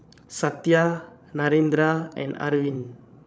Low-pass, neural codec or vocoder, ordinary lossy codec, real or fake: none; codec, 16 kHz, 16 kbps, FreqCodec, larger model; none; fake